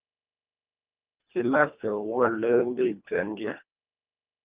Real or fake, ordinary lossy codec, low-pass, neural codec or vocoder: fake; Opus, 24 kbps; 3.6 kHz; codec, 24 kHz, 1.5 kbps, HILCodec